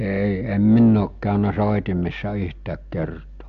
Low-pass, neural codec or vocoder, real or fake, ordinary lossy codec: 7.2 kHz; none; real; AAC, 64 kbps